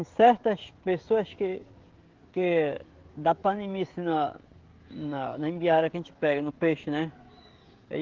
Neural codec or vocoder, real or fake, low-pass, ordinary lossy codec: codec, 16 kHz, 16 kbps, FreqCodec, smaller model; fake; 7.2 kHz; Opus, 16 kbps